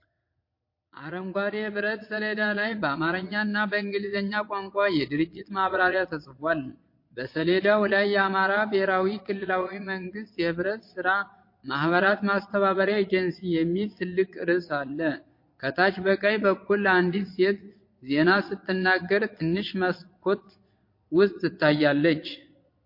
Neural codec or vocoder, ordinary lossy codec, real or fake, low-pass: vocoder, 22.05 kHz, 80 mel bands, Vocos; MP3, 32 kbps; fake; 5.4 kHz